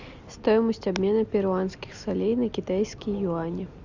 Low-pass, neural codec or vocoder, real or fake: 7.2 kHz; none; real